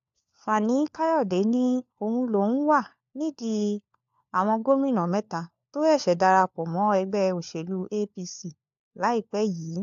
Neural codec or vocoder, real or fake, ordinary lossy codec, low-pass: codec, 16 kHz, 4 kbps, FunCodec, trained on LibriTTS, 50 frames a second; fake; AAC, 64 kbps; 7.2 kHz